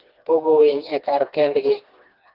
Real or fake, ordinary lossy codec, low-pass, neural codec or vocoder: fake; Opus, 32 kbps; 5.4 kHz; codec, 16 kHz, 2 kbps, FreqCodec, smaller model